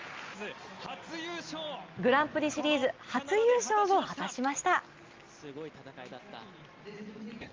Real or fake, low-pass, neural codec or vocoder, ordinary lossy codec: real; 7.2 kHz; none; Opus, 32 kbps